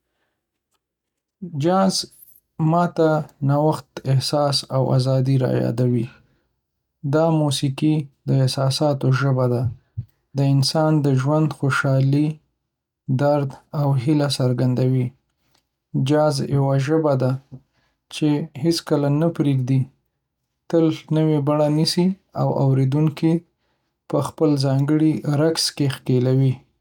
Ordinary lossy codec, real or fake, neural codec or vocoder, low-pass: none; real; none; 19.8 kHz